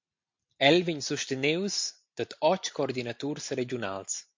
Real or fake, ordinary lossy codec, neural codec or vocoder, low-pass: real; MP3, 48 kbps; none; 7.2 kHz